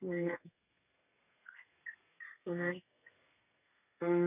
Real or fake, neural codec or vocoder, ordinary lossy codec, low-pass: fake; codec, 32 kHz, 1.9 kbps, SNAC; none; 3.6 kHz